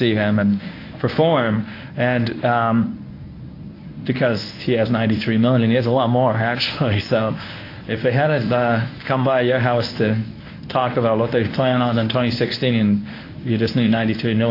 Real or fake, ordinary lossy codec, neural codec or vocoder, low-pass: fake; AAC, 32 kbps; codec, 24 kHz, 0.9 kbps, WavTokenizer, medium speech release version 1; 5.4 kHz